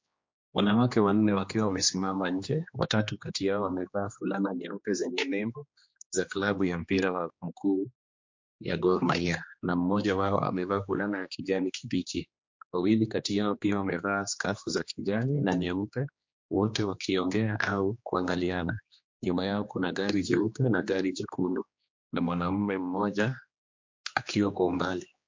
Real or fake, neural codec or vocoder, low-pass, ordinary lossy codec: fake; codec, 16 kHz, 2 kbps, X-Codec, HuBERT features, trained on general audio; 7.2 kHz; MP3, 48 kbps